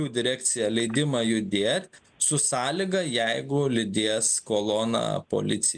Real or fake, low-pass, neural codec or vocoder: real; 9.9 kHz; none